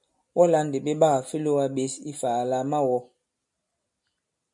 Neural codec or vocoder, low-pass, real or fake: none; 10.8 kHz; real